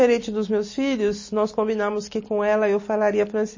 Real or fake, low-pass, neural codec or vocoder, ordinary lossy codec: real; 7.2 kHz; none; MP3, 32 kbps